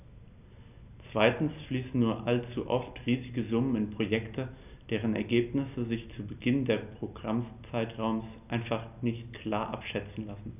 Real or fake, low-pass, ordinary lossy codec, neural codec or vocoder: real; 3.6 kHz; none; none